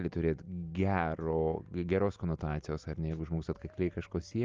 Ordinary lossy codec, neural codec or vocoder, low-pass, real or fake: Opus, 32 kbps; none; 7.2 kHz; real